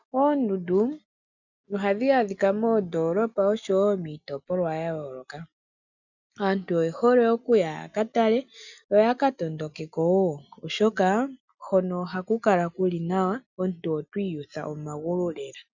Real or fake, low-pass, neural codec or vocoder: real; 7.2 kHz; none